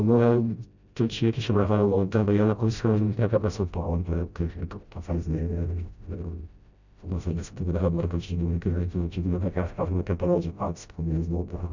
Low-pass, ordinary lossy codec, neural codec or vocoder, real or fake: 7.2 kHz; none; codec, 16 kHz, 0.5 kbps, FreqCodec, smaller model; fake